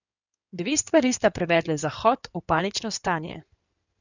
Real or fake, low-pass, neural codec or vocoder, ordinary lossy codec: fake; 7.2 kHz; codec, 16 kHz in and 24 kHz out, 2.2 kbps, FireRedTTS-2 codec; none